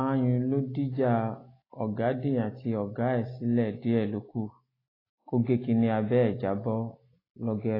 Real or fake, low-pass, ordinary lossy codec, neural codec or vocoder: real; 5.4 kHz; AAC, 24 kbps; none